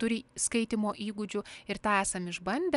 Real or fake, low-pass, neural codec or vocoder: real; 10.8 kHz; none